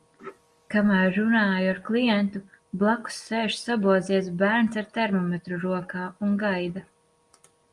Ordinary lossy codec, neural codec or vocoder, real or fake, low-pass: Opus, 32 kbps; none; real; 10.8 kHz